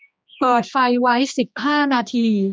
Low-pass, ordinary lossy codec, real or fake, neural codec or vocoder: none; none; fake; codec, 16 kHz, 2 kbps, X-Codec, HuBERT features, trained on general audio